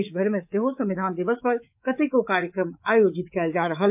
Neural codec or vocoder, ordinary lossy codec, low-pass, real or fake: codec, 24 kHz, 3.1 kbps, DualCodec; MP3, 32 kbps; 3.6 kHz; fake